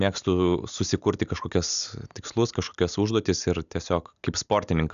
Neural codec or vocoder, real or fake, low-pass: none; real; 7.2 kHz